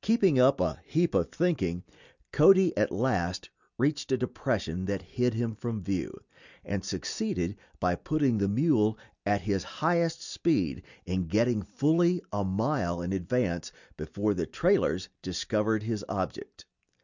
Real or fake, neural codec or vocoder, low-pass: real; none; 7.2 kHz